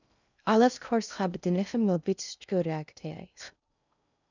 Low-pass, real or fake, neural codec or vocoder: 7.2 kHz; fake; codec, 16 kHz in and 24 kHz out, 0.6 kbps, FocalCodec, streaming, 2048 codes